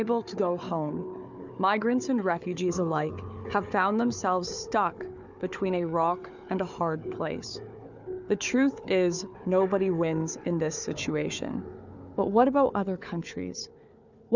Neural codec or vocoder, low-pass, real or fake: codec, 16 kHz, 4 kbps, FunCodec, trained on Chinese and English, 50 frames a second; 7.2 kHz; fake